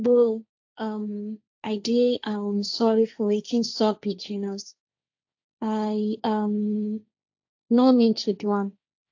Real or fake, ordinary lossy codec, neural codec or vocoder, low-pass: fake; AAC, 48 kbps; codec, 16 kHz, 1.1 kbps, Voila-Tokenizer; 7.2 kHz